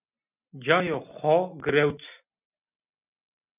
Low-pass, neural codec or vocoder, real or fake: 3.6 kHz; none; real